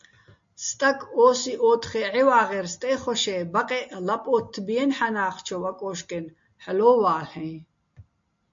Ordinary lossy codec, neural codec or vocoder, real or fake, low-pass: MP3, 48 kbps; none; real; 7.2 kHz